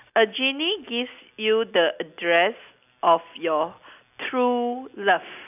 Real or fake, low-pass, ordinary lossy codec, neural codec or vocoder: real; 3.6 kHz; none; none